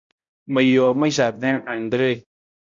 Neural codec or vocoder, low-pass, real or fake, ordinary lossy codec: codec, 16 kHz, 0.5 kbps, X-Codec, HuBERT features, trained on balanced general audio; 7.2 kHz; fake; MP3, 48 kbps